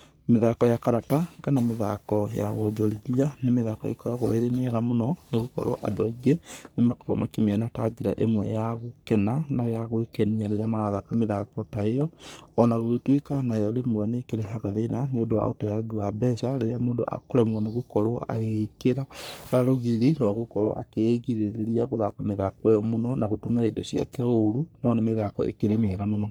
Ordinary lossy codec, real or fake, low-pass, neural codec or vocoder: none; fake; none; codec, 44.1 kHz, 3.4 kbps, Pupu-Codec